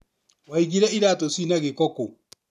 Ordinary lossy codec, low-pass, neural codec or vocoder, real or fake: none; 14.4 kHz; none; real